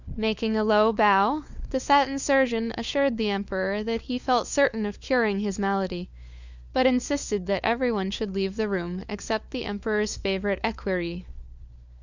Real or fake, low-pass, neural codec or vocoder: fake; 7.2 kHz; codec, 16 kHz, 4 kbps, FunCodec, trained on LibriTTS, 50 frames a second